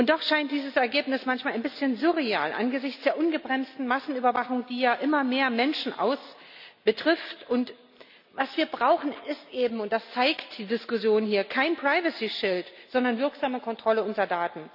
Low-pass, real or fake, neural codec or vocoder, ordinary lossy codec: 5.4 kHz; real; none; none